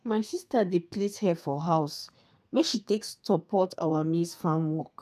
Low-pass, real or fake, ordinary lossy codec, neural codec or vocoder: 14.4 kHz; fake; none; codec, 32 kHz, 1.9 kbps, SNAC